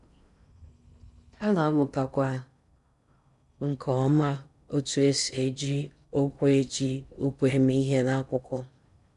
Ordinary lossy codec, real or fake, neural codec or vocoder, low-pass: none; fake; codec, 16 kHz in and 24 kHz out, 0.6 kbps, FocalCodec, streaming, 4096 codes; 10.8 kHz